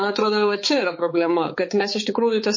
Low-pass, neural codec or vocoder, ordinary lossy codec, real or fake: 7.2 kHz; codec, 16 kHz, 4 kbps, X-Codec, HuBERT features, trained on balanced general audio; MP3, 32 kbps; fake